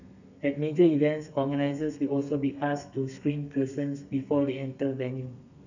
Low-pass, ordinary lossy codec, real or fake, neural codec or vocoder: 7.2 kHz; none; fake; codec, 32 kHz, 1.9 kbps, SNAC